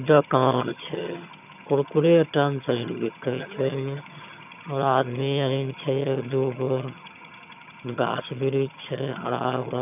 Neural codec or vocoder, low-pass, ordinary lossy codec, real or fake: vocoder, 22.05 kHz, 80 mel bands, HiFi-GAN; 3.6 kHz; none; fake